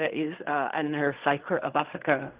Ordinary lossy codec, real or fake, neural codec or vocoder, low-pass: Opus, 32 kbps; fake; codec, 16 kHz in and 24 kHz out, 0.4 kbps, LongCat-Audio-Codec, fine tuned four codebook decoder; 3.6 kHz